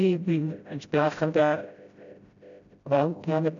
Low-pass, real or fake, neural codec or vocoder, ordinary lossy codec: 7.2 kHz; fake; codec, 16 kHz, 0.5 kbps, FreqCodec, smaller model; none